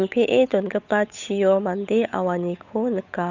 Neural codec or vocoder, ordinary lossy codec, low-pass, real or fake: codec, 16 kHz, 8 kbps, FunCodec, trained on Chinese and English, 25 frames a second; none; 7.2 kHz; fake